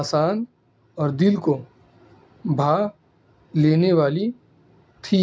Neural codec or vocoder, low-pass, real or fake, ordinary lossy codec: none; none; real; none